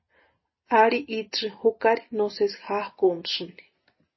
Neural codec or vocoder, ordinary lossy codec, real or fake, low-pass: none; MP3, 24 kbps; real; 7.2 kHz